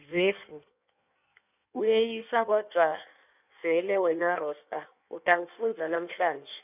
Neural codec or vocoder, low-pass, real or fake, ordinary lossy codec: codec, 16 kHz in and 24 kHz out, 1.1 kbps, FireRedTTS-2 codec; 3.6 kHz; fake; none